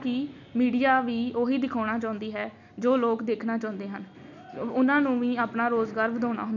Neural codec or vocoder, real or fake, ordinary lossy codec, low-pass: none; real; none; 7.2 kHz